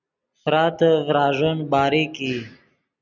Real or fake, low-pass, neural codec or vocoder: real; 7.2 kHz; none